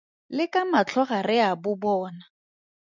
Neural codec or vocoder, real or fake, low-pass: none; real; 7.2 kHz